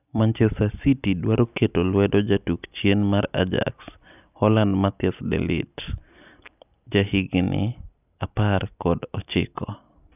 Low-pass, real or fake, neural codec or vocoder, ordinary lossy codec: 3.6 kHz; real; none; none